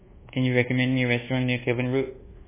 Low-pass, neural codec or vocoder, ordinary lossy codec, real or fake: 3.6 kHz; codec, 24 kHz, 1.2 kbps, DualCodec; MP3, 16 kbps; fake